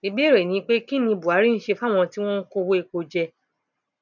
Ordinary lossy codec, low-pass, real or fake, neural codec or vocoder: none; 7.2 kHz; real; none